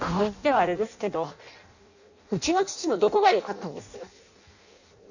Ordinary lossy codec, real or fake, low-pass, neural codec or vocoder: none; fake; 7.2 kHz; codec, 16 kHz in and 24 kHz out, 0.6 kbps, FireRedTTS-2 codec